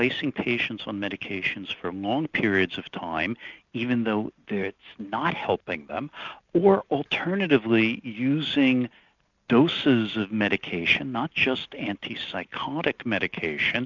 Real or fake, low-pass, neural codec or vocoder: real; 7.2 kHz; none